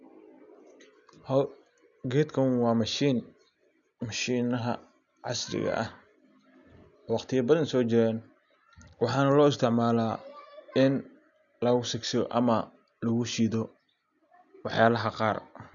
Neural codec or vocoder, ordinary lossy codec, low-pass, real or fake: none; none; 7.2 kHz; real